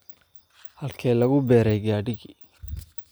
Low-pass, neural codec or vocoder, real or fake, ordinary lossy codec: none; none; real; none